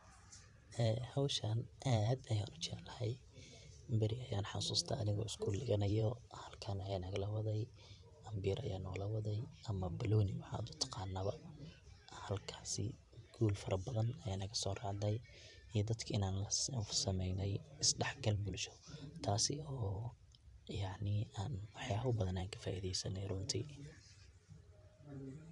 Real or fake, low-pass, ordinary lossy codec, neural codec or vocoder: fake; 10.8 kHz; none; vocoder, 44.1 kHz, 128 mel bands every 512 samples, BigVGAN v2